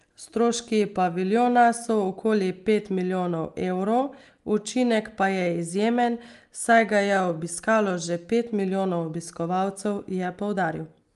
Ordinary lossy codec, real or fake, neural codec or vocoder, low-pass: Opus, 32 kbps; real; none; 10.8 kHz